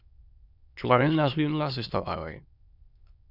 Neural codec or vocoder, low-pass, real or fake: autoencoder, 22.05 kHz, a latent of 192 numbers a frame, VITS, trained on many speakers; 5.4 kHz; fake